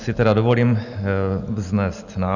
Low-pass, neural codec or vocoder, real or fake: 7.2 kHz; none; real